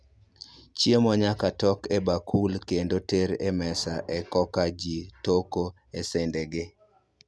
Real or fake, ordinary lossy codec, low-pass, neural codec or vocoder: real; none; none; none